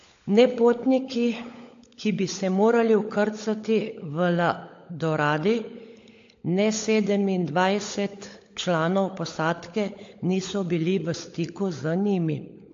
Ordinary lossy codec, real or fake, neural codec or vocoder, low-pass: AAC, 48 kbps; fake; codec, 16 kHz, 16 kbps, FunCodec, trained on LibriTTS, 50 frames a second; 7.2 kHz